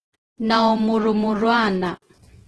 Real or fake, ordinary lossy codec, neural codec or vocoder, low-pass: fake; Opus, 16 kbps; vocoder, 48 kHz, 128 mel bands, Vocos; 10.8 kHz